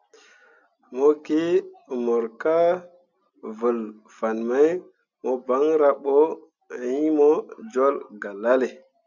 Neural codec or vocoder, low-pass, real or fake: none; 7.2 kHz; real